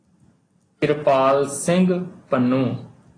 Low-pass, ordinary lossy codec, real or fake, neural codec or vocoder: 9.9 kHz; AAC, 32 kbps; real; none